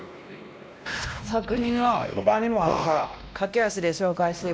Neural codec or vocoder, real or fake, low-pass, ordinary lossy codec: codec, 16 kHz, 1 kbps, X-Codec, WavLM features, trained on Multilingual LibriSpeech; fake; none; none